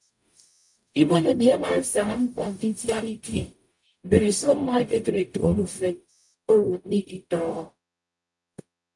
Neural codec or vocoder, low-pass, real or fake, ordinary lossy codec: codec, 44.1 kHz, 0.9 kbps, DAC; 10.8 kHz; fake; MP3, 96 kbps